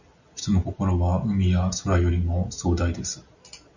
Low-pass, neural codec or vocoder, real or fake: 7.2 kHz; none; real